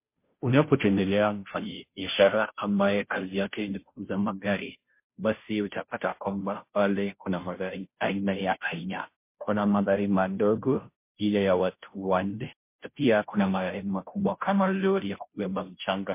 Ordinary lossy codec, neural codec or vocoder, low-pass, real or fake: MP3, 24 kbps; codec, 16 kHz, 0.5 kbps, FunCodec, trained on Chinese and English, 25 frames a second; 3.6 kHz; fake